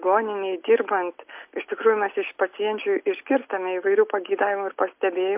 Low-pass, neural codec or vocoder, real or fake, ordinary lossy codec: 3.6 kHz; none; real; MP3, 32 kbps